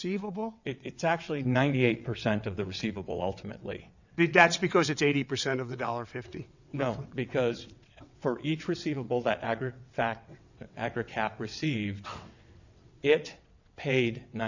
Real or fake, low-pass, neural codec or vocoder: fake; 7.2 kHz; vocoder, 22.05 kHz, 80 mel bands, WaveNeXt